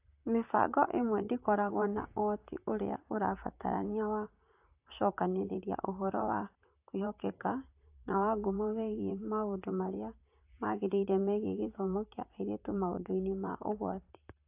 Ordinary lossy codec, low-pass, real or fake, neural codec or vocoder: AAC, 32 kbps; 3.6 kHz; fake; vocoder, 44.1 kHz, 128 mel bands, Pupu-Vocoder